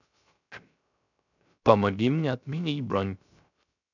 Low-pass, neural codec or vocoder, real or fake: 7.2 kHz; codec, 16 kHz, 0.3 kbps, FocalCodec; fake